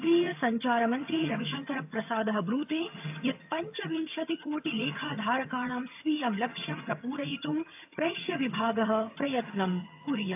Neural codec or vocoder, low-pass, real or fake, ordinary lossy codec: vocoder, 22.05 kHz, 80 mel bands, HiFi-GAN; 3.6 kHz; fake; AAC, 32 kbps